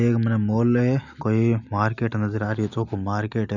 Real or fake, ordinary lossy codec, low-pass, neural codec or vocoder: real; none; 7.2 kHz; none